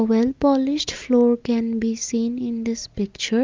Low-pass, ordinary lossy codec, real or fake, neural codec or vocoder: 7.2 kHz; Opus, 24 kbps; real; none